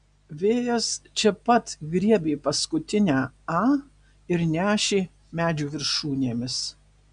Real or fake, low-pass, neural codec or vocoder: real; 9.9 kHz; none